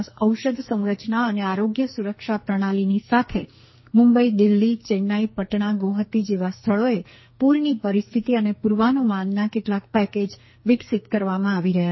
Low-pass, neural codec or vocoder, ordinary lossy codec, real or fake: 7.2 kHz; codec, 44.1 kHz, 2.6 kbps, SNAC; MP3, 24 kbps; fake